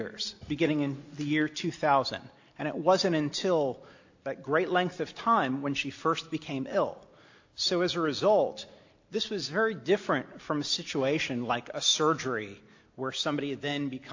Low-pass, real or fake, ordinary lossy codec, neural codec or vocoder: 7.2 kHz; real; AAC, 48 kbps; none